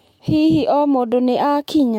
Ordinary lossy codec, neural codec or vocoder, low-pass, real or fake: MP3, 64 kbps; autoencoder, 48 kHz, 128 numbers a frame, DAC-VAE, trained on Japanese speech; 19.8 kHz; fake